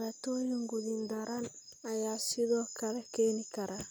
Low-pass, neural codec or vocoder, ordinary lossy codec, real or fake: none; none; none; real